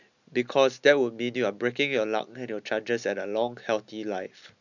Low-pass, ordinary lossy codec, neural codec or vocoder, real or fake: 7.2 kHz; none; none; real